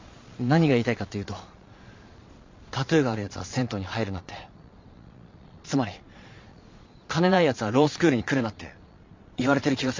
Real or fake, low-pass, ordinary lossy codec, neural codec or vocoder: fake; 7.2 kHz; MP3, 48 kbps; vocoder, 22.05 kHz, 80 mel bands, Vocos